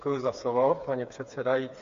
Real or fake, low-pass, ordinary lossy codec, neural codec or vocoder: fake; 7.2 kHz; MP3, 48 kbps; codec, 16 kHz, 4 kbps, FreqCodec, smaller model